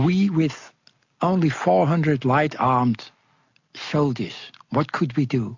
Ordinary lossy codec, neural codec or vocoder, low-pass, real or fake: MP3, 48 kbps; none; 7.2 kHz; real